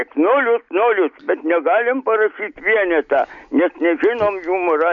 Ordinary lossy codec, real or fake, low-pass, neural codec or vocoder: MP3, 48 kbps; real; 7.2 kHz; none